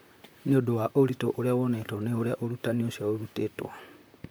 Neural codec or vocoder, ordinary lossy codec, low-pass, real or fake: vocoder, 44.1 kHz, 128 mel bands, Pupu-Vocoder; none; none; fake